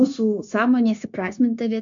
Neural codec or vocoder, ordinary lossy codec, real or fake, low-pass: codec, 16 kHz, 0.9 kbps, LongCat-Audio-Codec; MP3, 64 kbps; fake; 7.2 kHz